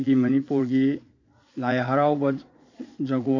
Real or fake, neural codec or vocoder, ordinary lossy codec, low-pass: fake; vocoder, 22.05 kHz, 80 mel bands, Vocos; AAC, 48 kbps; 7.2 kHz